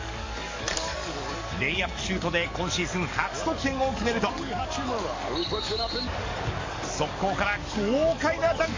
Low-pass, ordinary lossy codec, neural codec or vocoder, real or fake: 7.2 kHz; MP3, 48 kbps; codec, 44.1 kHz, 7.8 kbps, DAC; fake